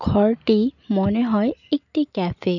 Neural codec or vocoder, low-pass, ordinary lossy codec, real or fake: none; 7.2 kHz; none; real